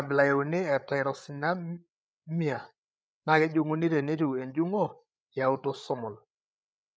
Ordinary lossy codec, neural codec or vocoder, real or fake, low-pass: none; codec, 16 kHz, 8 kbps, FreqCodec, larger model; fake; none